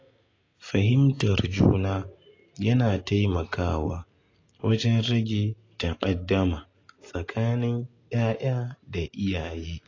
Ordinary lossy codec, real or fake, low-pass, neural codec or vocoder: AAC, 32 kbps; real; 7.2 kHz; none